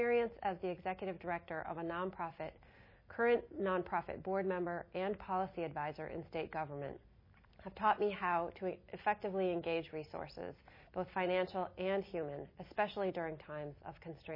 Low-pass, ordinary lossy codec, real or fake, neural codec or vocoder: 5.4 kHz; MP3, 24 kbps; real; none